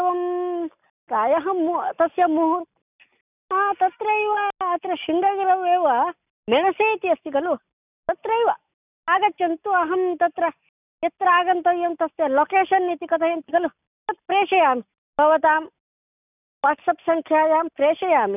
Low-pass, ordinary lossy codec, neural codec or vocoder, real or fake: 3.6 kHz; none; none; real